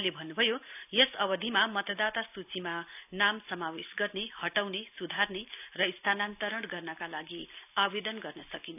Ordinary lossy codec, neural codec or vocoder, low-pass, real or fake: none; none; 3.6 kHz; real